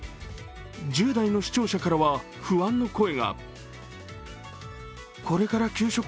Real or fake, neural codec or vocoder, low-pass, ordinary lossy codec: real; none; none; none